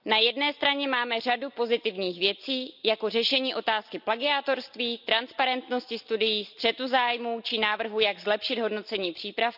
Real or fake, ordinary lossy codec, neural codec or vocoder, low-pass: real; none; none; 5.4 kHz